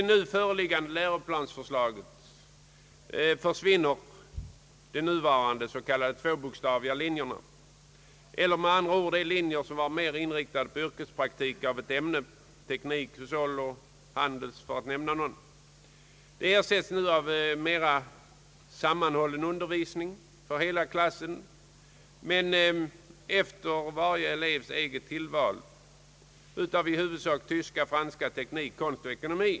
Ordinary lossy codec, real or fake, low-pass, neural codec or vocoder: none; real; none; none